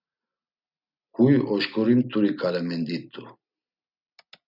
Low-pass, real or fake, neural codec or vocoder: 5.4 kHz; real; none